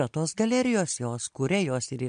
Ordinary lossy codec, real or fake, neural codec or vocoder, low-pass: MP3, 64 kbps; real; none; 9.9 kHz